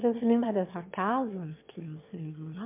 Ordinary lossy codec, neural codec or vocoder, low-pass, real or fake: MP3, 32 kbps; autoencoder, 22.05 kHz, a latent of 192 numbers a frame, VITS, trained on one speaker; 3.6 kHz; fake